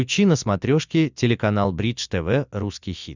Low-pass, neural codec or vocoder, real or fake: 7.2 kHz; none; real